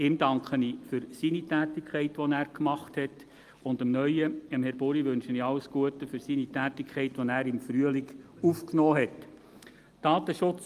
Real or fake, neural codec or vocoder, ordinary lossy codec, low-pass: real; none; Opus, 32 kbps; 14.4 kHz